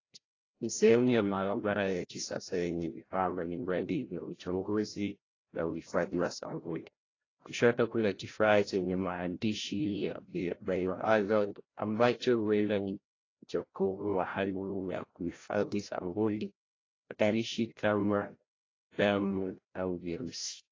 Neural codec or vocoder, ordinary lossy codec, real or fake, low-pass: codec, 16 kHz, 0.5 kbps, FreqCodec, larger model; AAC, 32 kbps; fake; 7.2 kHz